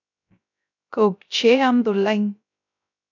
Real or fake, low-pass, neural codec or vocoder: fake; 7.2 kHz; codec, 16 kHz, 0.3 kbps, FocalCodec